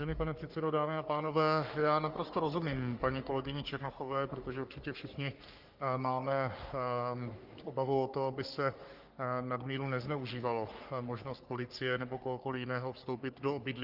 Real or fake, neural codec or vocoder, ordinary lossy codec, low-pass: fake; codec, 44.1 kHz, 3.4 kbps, Pupu-Codec; Opus, 24 kbps; 5.4 kHz